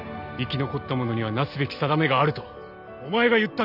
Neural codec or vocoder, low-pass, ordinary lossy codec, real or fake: none; 5.4 kHz; none; real